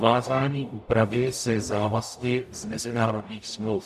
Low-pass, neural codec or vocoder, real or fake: 14.4 kHz; codec, 44.1 kHz, 0.9 kbps, DAC; fake